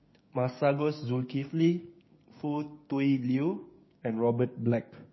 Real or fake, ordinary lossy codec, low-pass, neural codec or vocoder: fake; MP3, 24 kbps; 7.2 kHz; codec, 16 kHz, 6 kbps, DAC